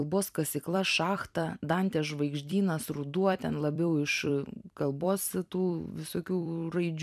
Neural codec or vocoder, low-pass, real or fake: vocoder, 44.1 kHz, 128 mel bands every 256 samples, BigVGAN v2; 14.4 kHz; fake